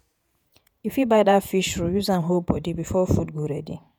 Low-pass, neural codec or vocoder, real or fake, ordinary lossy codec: none; vocoder, 48 kHz, 128 mel bands, Vocos; fake; none